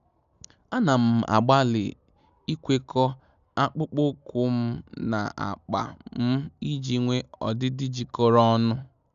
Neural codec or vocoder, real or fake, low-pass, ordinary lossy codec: none; real; 7.2 kHz; none